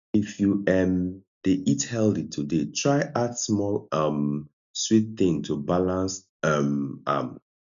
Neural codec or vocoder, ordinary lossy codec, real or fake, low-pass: none; none; real; 7.2 kHz